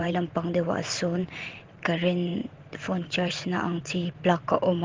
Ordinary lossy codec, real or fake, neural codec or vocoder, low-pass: Opus, 16 kbps; fake; vocoder, 44.1 kHz, 128 mel bands every 512 samples, BigVGAN v2; 7.2 kHz